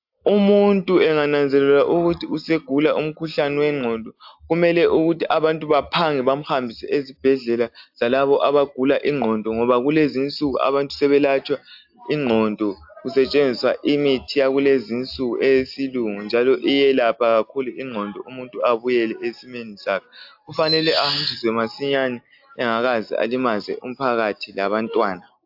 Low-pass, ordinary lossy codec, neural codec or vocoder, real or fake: 5.4 kHz; AAC, 48 kbps; none; real